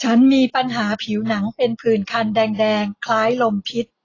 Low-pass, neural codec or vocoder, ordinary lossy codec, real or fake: 7.2 kHz; none; AAC, 32 kbps; real